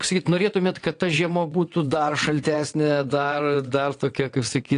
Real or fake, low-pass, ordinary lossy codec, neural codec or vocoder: fake; 9.9 kHz; AAC, 48 kbps; vocoder, 22.05 kHz, 80 mel bands, WaveNeXt